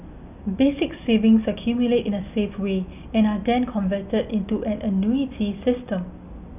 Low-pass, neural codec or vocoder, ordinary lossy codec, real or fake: 3.6 kHz; none; none; real